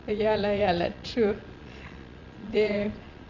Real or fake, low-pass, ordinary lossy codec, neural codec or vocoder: fake; 7.2 kHz; none; vocoder, 22.05 kHz, 80 mel bands, Vocos